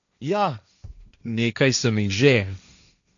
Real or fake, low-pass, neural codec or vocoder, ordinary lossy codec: fake; 7.2 kHz; codec, 16 kHz, 1.1 kbps, Voila-Tokenizer; none